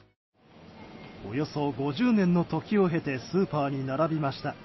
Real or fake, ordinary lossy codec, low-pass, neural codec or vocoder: real; MP3, 24 kbps; 7.2 kHz; none